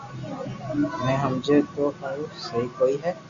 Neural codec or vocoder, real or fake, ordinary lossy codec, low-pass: none; real; Opus, 64 kbps; 7.2 kHz